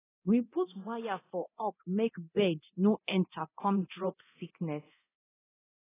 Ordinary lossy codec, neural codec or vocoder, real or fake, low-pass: AAC, 16 kbps; codec, 24 kHz, 0.9 kbps, DualCodec; fake; 3.6 kHz